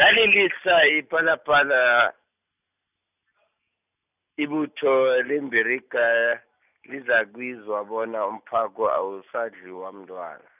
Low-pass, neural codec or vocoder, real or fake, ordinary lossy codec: 3.6 kHz; none; real; none